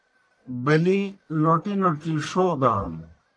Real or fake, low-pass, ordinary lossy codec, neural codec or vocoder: fake; 9.9 kHz; AAC, 64 kbps; codec, 44.1 kHz, 1.7 kbps, Pupu-Codec